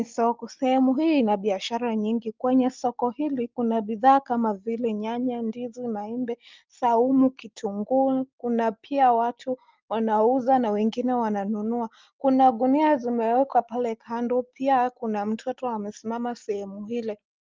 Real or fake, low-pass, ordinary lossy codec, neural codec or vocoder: real; 7.2 kHz; Opus, 24 kbps; none